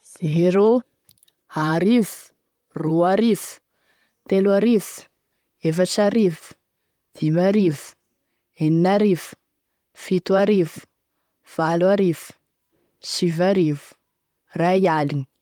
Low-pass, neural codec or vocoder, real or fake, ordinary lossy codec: 19.8 kHz; vocoder, 44.1 kHz, 128 mel bands, Pupu-Vocoder; fake; Opus, 32 kbps